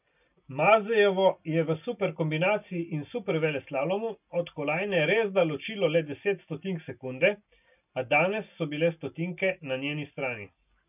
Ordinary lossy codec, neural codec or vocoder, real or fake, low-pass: none; none; real; 3.6 kHz